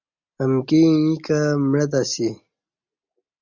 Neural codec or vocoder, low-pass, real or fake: none; 7.2 kHz; real